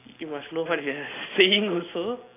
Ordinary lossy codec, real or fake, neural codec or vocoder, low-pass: AAC, 24 kbps; real; none; 3.6 kHz